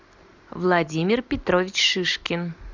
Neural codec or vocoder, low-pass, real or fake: none; 7.2 kHz; real